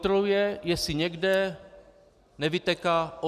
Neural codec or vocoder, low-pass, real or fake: none; 14.4 kHz; real